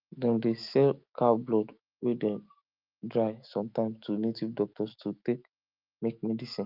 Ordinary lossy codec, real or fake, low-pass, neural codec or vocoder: Opus, 24 kbps; fake; 5.4 kHz; autoencoder, 48 kHz, 128 numbers a frame, DAC-VAE, trained on Japanese speech